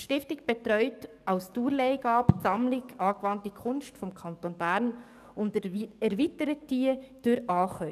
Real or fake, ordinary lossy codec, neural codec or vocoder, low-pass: fake; none; codec, 44.1 kHz, 7.8 kbps, DAC; 14.4 kHz